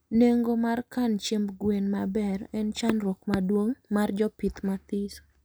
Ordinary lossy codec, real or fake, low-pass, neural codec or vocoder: none; real; none; none